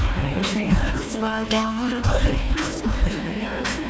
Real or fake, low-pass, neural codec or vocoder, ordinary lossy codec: fake; none; codec, 16 kHz, 1 kbps, FunCodec, trained on Chinese and English, 50 frames a second; none